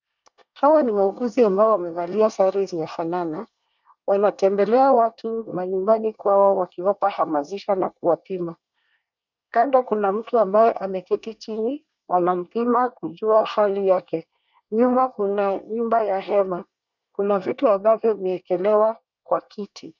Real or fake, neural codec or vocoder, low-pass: fake; codec, 24 kHz, 1 kbps, SNAC; 7.2 kHz